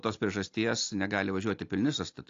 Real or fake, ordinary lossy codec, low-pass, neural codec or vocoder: real; AAC, 48 kbps; 7.2 kHz; none